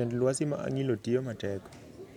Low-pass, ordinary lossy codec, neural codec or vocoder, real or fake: 19.8 kHz; none; none; real